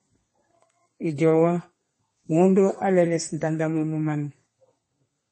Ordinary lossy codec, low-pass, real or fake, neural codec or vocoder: MP3, 32 kbps; 10.8 kHz; fake; codec, 32 kHz, 1.9 kbps, SNAC